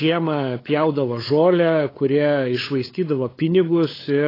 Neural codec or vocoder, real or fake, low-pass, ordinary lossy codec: codec, 16 kHz, 8 kbps, FunCodec, trained on LibriTTS, 25 frames a second; fake; 5.4 kHz; AAC, 24 kbps